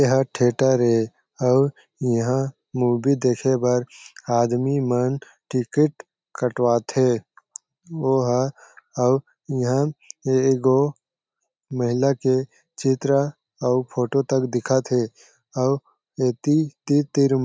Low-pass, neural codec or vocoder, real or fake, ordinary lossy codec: none; none; real; none